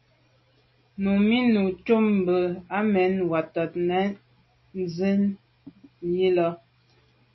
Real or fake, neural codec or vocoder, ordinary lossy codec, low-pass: real; none; MP3, 24 kbps; 7.2 kHz